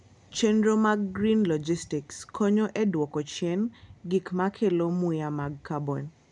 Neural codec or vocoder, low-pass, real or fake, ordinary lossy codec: none; 10.8 kHz; real; none